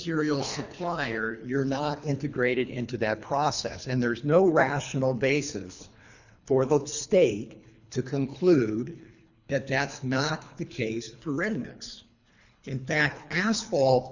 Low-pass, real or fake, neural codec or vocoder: 7.2 kHz; fake; codec, 24 kHz, 3 kbps, HILCodec